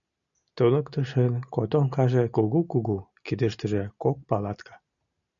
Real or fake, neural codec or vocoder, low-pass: real; none; 7.2 kHz